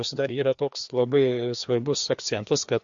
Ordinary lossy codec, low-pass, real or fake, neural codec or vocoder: MP3, 48 kbps; 7.2 kHz; fake; codec, 16 kHz, 2 kbps, FreqCodec, larger model